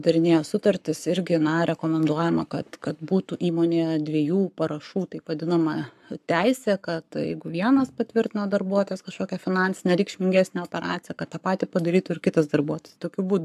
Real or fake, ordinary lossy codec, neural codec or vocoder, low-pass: fake; AAC, 96 kbps; codec, 44.1 kHz, 7.8 kbps, Pupu-Codec; 14.4 kHz